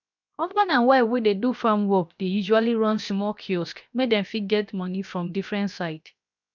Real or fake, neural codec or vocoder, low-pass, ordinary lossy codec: fake; codec, 16 kHz, 0.7 kbps, FocalCodec; 7.2 kHz; none